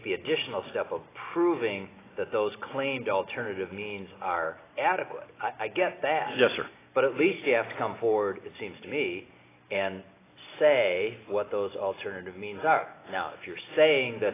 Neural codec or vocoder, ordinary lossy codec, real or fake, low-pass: none; AAC, 16 kbps; real; 3.6 kHz